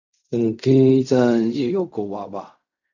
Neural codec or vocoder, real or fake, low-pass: codec, 16 kHz in and 24 kHz out, 0.4 kbps, LongCat-Audio-Codec, fine tuned four codebook decoder; fake; 7.2 kHz